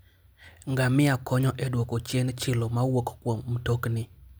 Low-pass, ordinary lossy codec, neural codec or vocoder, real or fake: none; none; none; real